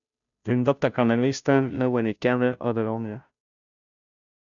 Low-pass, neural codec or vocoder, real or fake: 7.2 kHz; codec, 16 kHz, 0.5 kbps, FunCodec, trained on Chinese and English, 25 frames a second; fake